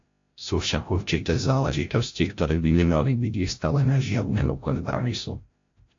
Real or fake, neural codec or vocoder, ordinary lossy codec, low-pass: fake; codec, 16 kHz, 0.5 kbps, FreqCodec, larger model; AAC, 48 kbps; 7.2 kHz